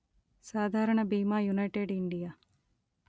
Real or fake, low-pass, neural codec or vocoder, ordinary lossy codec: real; none; none; none